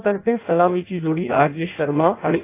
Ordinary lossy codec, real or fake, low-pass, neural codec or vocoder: AAC, 24 kbps; fake; 3.6 kHz; codec, 16 kHz in and 24 kHz out, 0.6 kbps, FireRedTTS-2 codec